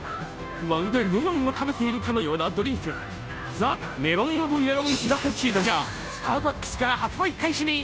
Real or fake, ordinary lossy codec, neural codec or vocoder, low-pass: fake; none; codec, 16 kHz, 0.5 kbps, FunCodec, trained on Chinese and English, 25 frames a second; none